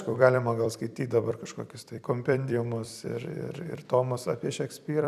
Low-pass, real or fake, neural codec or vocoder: 14.4 kHz; fake; vocoder, 44.1 kHz, 128 mel bands every 256 samples, BigVGAN v2